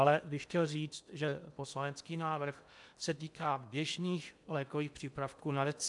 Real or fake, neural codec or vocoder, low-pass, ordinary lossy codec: fake; codec, 16 kHz in and 24 kHz out, 0.8 kbps, FocalCodec, streaming, 65536 codes; 10.8 kHz; MP3, 96 kbps